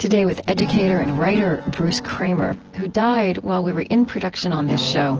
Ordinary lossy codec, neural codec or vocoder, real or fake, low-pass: Opus, 16 kbps; vocoder, 24 kHz, 100 mel bands, Vocos; fake; 7.2 kHz